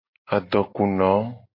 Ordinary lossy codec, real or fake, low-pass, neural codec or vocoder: MP3, 32 kbps; real; 5.4 kHz; none